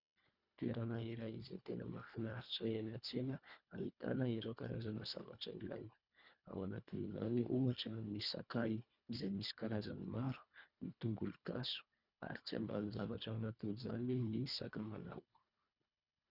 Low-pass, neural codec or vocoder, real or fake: 5.4 kHz; codec, 24 kHz, 1.5 kbps, HILCodec; fake